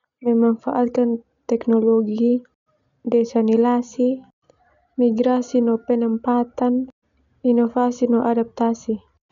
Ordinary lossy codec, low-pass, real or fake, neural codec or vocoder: none; 7.2 kHz; real; none